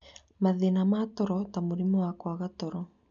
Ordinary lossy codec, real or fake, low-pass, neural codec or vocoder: none; real; 7.2 kHz; none